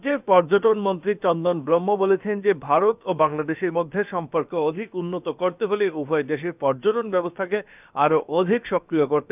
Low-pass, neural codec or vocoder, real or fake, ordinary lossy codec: 3.6 kHz; codec, 16 kHz, about 1 kbps, DyCAST, with the encoder's durations; fake; none